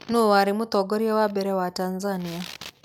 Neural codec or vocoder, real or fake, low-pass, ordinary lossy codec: none; real; none; none